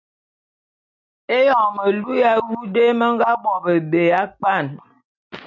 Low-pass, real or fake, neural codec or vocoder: 7.2 kHz; real; none